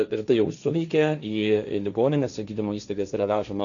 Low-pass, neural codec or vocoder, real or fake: 7.2 kHz; codec, 16 kHz, 1.1 kbps, Voila-Tokenizer; fake